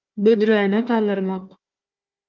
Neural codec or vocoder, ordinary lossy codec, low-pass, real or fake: codec, 16 kHz, 1 kbps, FunCodec, trained on Chinese and English, 50 frames a second; Opus, 32 kbps; 7.2 kHz; fake